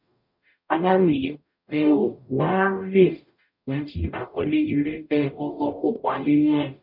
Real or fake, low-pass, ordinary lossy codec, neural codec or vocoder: fake; 5.4 kHz; none; codec, 44.1 kHz, 0.9 kbps, DAC